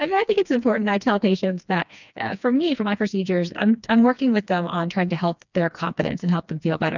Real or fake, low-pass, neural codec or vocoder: fake; 7.2 kHz; codec, 16 kHz, 2 kbps, FreqCodec, smaller model